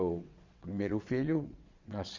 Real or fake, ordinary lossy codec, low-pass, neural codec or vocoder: fake; Opus, 64 kbps; 7.2 kHz; vocoder, 22.05 kHz, 80 mel bands, WaveNeXt